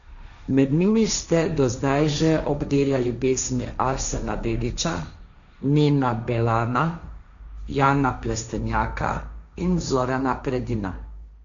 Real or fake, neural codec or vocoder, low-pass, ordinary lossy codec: fake; codec, 16 kHz, 1.1 kbps, Voila-Tokenizer; 7.2 kHz; none